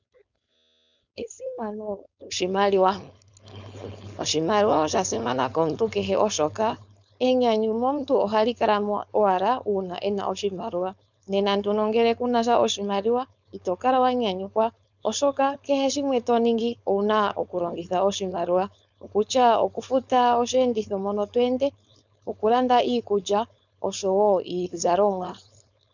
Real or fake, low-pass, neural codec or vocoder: fake; 7.2 kHz; codec, 16 kHz, 4.8 kbps, FACodec